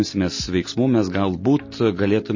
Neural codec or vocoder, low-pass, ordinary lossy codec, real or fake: none; 7.2 kHz; MP3, 32 kbps; real